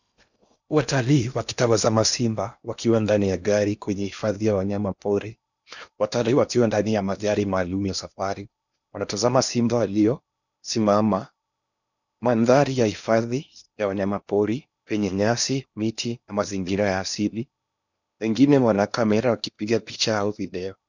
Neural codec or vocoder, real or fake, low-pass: codec, 16 kHz in and 24 kHz out, 0.8 kbps, FocalCodec, streaming, 65536 codes; fake; 7.2 kHz